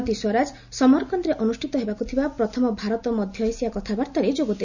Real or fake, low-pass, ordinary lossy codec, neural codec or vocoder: real; 7.2 kHz; none; none